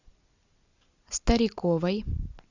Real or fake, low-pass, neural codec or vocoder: real; 7.2 kHz; none